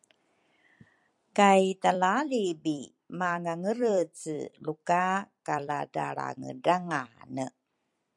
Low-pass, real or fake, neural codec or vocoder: 10.8 kHz; fake; vocoder, 44.1 kHz, 128 mel bands every 256 samples, BigVGAN v2